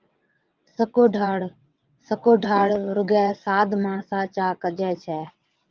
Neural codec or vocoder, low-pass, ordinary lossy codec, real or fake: vocoder, 22.05 kHz, 80 mel bands, WaveNeXt; 7.2 kHz; Opus, 24 kbps; fake